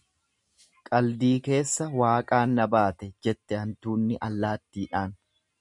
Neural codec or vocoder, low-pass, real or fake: none; 10.8 kHz; real